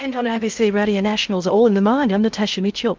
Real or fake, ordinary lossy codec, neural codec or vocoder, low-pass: fake; Opus, 24 kbps; codec, 16 kHz in and 24 kHz out, 0.6 kbps, FocalCodec, streaming, 2048 codes; 7.2 kHz